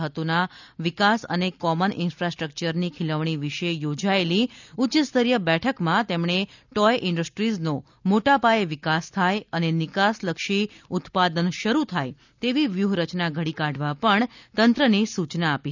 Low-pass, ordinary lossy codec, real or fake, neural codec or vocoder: 7.2 kHz; none; real; none